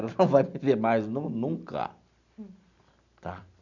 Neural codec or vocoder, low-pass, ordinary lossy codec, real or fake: none; 7.2 kHz; none; real